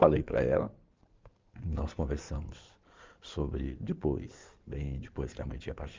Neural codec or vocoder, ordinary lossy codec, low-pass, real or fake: codec, 16 kHz in and 24 kHz out, 2.2 kbps, FireRedTTS-2 codec; Opus, 24 kbps; 7.2 kHz; fake